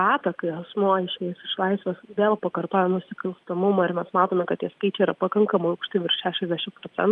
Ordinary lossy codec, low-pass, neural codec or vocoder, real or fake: Opus, 32 kbps; 10.8 kHz; none; real